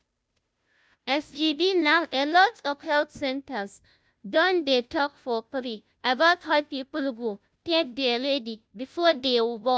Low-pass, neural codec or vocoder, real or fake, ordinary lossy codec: none; codec, 16 kHz, 0.5 kbps, FunCodec, trained on Chinese and English, 25 frames a second; fake; none